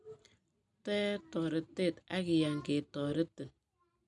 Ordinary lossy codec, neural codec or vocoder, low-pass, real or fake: none; none; 10.8 kHz; real